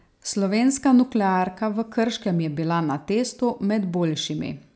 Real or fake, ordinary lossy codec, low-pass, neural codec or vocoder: real; none; none; none